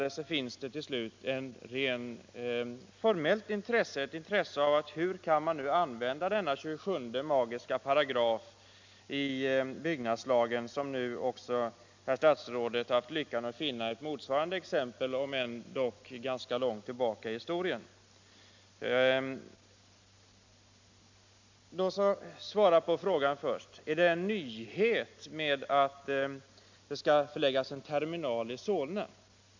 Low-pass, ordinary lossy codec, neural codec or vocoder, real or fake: 7.2 kHz; MP3, 64 kbps; none; real